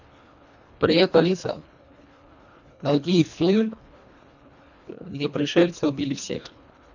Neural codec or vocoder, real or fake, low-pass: codec, 24 kHz, 1.5 kbps, HILCodec; fake; 7.2 kHz